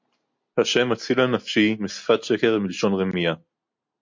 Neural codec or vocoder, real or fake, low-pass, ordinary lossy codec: none; real; 7.2 kHz; MP3, 48 kbps